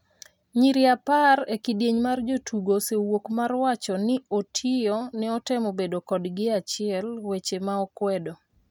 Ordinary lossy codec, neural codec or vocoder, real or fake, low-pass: none; none; real; 19.8 kHz